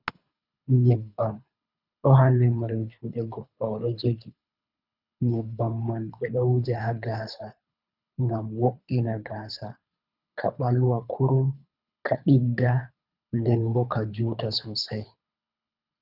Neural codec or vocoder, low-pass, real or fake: codec, 24 kHz, 3 kbps, HILCodec; 5.4 kHz; fake